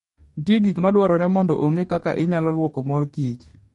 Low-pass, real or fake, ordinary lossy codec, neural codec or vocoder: 19.8 kHz; fake; MP3, 48 kbps; codec, 44.1 kHz, 2.6 kbps, DAC